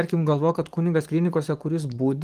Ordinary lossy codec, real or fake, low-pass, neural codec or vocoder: Opus, 24 kbps; fake; 14.4 kHz; codec, 44.1 kHz, 7.8 kbps, DAC